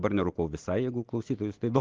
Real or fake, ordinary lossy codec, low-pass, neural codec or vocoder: real; Opus, 16 kbps; 7.2 kHz; none